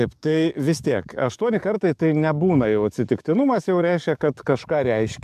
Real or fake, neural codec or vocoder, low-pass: fake; codec, 44.1 kHz, 7.8 kbps, DAC; 14.4 kHz